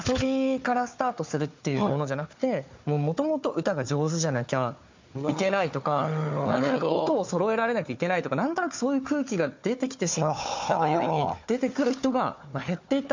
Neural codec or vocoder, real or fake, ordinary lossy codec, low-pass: codec, 16 kHz, 4 kbps, FunCodec, trained on Chinese and English, 50 frames a second; fake; MP3, 64 kbps; 7.2 kHz